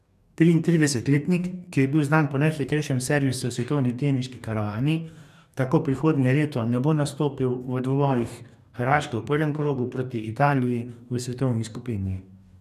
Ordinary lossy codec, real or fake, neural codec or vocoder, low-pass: none; fake; codec, 44.1 kHz, 2.6 kbps, DAC; 14.4 kHz